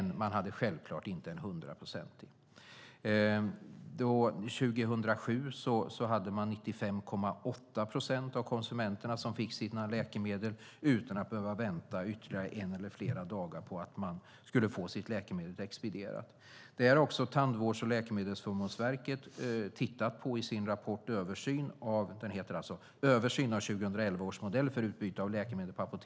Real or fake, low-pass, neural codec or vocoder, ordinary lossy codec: real; none; none; none